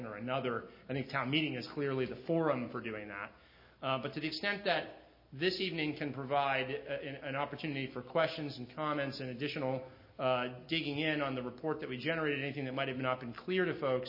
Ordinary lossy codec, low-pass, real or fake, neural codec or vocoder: MP3, 24 kbps; 5.4 kHz; real; none